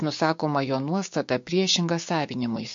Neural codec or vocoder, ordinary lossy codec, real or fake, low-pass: codec, 16 kHz, 6 kbps, DAC; MP3, 48 kbps; fake; 7.2 kHz